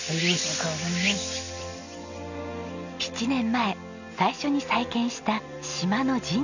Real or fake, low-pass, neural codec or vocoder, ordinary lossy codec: real; 7.2 kHz; none; none